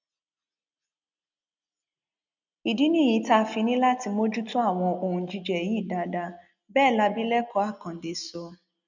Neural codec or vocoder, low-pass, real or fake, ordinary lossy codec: none; 7.2 kHz; real; none